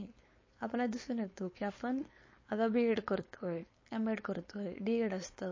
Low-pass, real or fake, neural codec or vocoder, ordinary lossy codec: 7.2 kHz; fake; codec, 16 kHz, 4.8 kbps, FACodec; MP3, 32 kbps